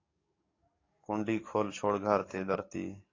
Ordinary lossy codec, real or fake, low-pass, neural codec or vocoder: AAC, 32 kbps; fake; 7.2 kHz; codec, 44.1 kHz, 7.8 kbps, DAC